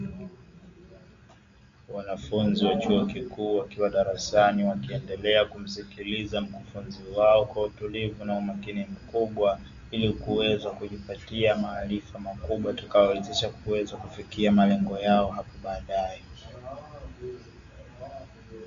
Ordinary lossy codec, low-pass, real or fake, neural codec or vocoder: Opus, 64 kbps; 7.2 kHz; real; none